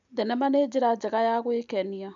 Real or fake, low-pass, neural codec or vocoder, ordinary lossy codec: real; 7.2 kHz; none; none